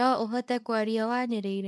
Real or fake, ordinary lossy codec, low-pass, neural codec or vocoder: fake; none; none; codec, 24 kHz, 0.9 kbps, WavTokenizer, small release